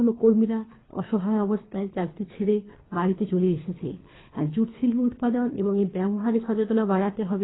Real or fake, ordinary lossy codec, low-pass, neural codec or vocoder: fake; AAC, 16 kbps; 7.2 kHz; codec, 24 kHz, 3 kbps, HILCodec